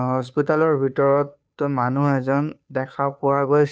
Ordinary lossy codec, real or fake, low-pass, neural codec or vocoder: none; fake; none; codec, 16 kHz, 2 kbps, FunCodec, trained on Chinese and English, 25 frames a second